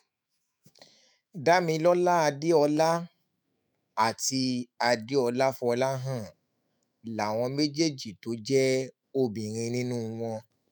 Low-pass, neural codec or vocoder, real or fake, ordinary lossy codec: none; autoencoder, 48 kHz, 128 numbers a frame, DAC-VAE, trained on Japanese speech; fake; none